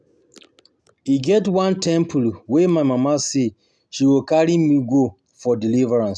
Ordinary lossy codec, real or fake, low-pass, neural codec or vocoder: none; real; none; none